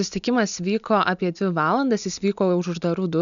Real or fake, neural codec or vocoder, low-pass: real; none; 7.2 kHz